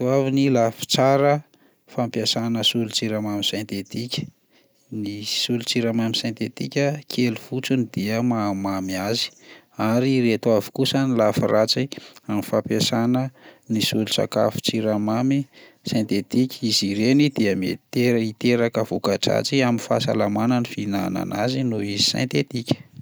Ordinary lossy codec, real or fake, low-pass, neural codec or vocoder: none; real; none; none